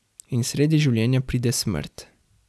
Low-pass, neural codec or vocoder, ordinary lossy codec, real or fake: none; none; none; real